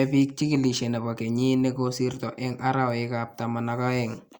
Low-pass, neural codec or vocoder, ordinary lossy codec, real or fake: 19.8 kHz; none; Opus, 64 kbps; real